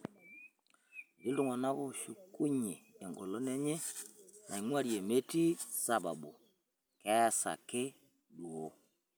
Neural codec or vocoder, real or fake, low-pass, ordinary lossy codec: none; real; none; none